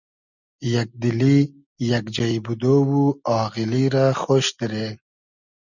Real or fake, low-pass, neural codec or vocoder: real; 7.2 kHz; none